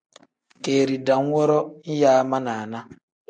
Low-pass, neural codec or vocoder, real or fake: 9.9 kHz; none; real